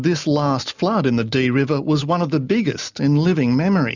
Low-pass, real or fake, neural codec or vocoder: 7.2 kHz; real; none